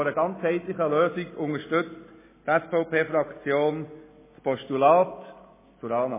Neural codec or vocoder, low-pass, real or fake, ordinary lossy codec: none; 3.6 kHz; real; MP3, 16 kbps